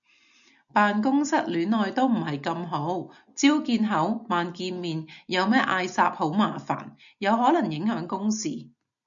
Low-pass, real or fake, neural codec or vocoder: 7.2 kHz; real; none